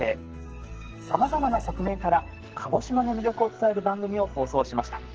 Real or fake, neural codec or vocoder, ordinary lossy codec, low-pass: fake; codec, 44.1 kHz, 2.6 kbps, SNAC; Opus, 16 kbps; 7.2 kHz